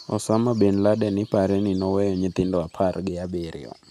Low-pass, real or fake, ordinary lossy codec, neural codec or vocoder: 14.4 kHz; real; none; none